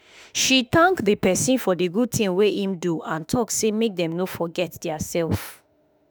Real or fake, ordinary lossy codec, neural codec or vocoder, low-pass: fake; none; autoencoder, 48 kHz, 32 numbers a frame, DAC-VAE, trained on Japanese speech; none